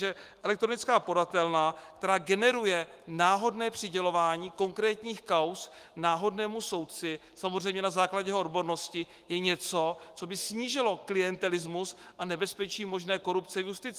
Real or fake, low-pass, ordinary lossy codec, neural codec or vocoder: fake; 14.4 kHz; Opus, 24 kbps; autoencoder, 48 kHz, 128 numbers a frame, DAC-VAE, trained on Japanese speech